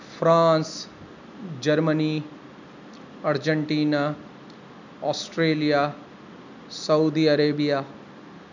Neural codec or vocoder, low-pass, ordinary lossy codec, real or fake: none; 7.2 kHz; none; real